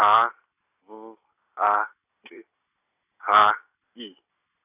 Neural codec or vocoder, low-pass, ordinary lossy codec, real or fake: none; 3.6 kHz; none; real